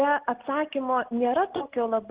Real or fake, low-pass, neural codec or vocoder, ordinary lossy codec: real; 3.6 kHz; none; Opus, 16 kbps